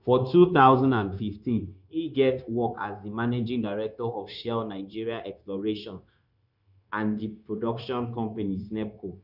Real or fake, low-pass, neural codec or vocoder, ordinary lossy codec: fake; 5.4 kHz; codec, 16 kHz, 0.9 kbps, LongCat-Audio-Codec; none